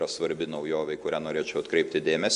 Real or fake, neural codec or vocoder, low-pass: real; none; 10.8 kHz